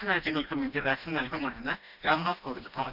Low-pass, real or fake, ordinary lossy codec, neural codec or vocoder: 5.4 kHz; fake; none; codec, 16 kHz, 1 kbps, FreqCodec, smaller model